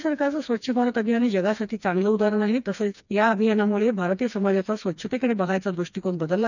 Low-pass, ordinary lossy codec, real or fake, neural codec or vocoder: 7.2 kHz; none; fake; codec, 16 kHz, 2 kbps, FreqCodec, smaller model